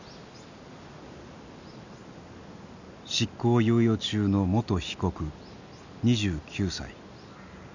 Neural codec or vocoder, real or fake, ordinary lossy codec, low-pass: none; real; none; 7.2 kHz